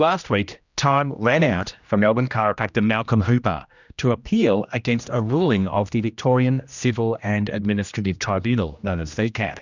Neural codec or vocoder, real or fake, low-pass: codec, 16 kHz, 1 kbps, X-Codec, HuBERT features, trained on general audio; fake; 7.2 kHz